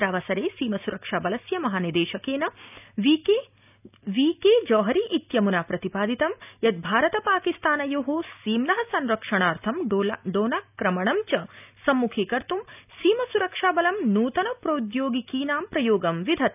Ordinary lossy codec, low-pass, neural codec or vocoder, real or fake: none; 3.6 kHz; none; real